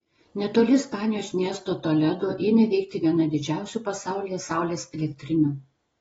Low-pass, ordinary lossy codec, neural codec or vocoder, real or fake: 19.8 kHz; AAC, 24 kbps; none; real